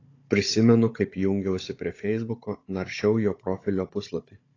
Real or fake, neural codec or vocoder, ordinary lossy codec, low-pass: fake; codec, 16 kHz, 16 kbps, FunCodec, trained on Chinese and English, 50 frames a second; AAC, 32 kbps; 7.2 kHz